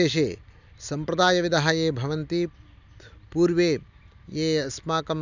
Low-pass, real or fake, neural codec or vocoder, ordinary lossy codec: 7.2 kHz; real; none; none